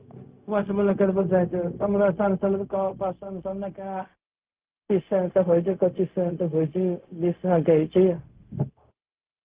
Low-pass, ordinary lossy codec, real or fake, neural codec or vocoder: 3.6 kHz; Opus, 32 kbps; fake; codec, 16 kHz, 0.4 kbps, LongCat-Audio-Codec